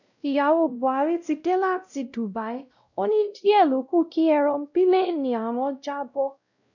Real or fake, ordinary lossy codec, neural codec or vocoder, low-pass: fake; none; codec, 16 kHz, 0.5 kbps, X-Codec, WavLM features, trained on Multilingual LibriSpeech; 7.2 kHz